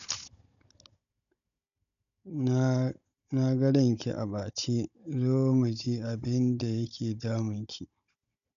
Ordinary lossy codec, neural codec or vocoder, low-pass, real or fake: MP3, 96 kbps; codec, 16 kHz, 16 kbps, FunCodec, trained on Chinese and English, 50 frames a second; 7.2 kHz; fake